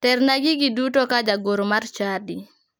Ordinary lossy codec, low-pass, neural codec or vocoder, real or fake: none; none; none; real